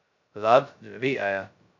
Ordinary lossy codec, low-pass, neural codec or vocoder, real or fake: MP3, 64 kbps; 7.2 kHz; codec, 16 kHz, 0.2 kbps, FocalCodec; fake